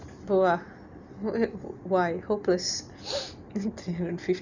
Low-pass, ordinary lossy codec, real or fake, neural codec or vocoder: 7.2 kHz; Opus, 64 kbps; real; none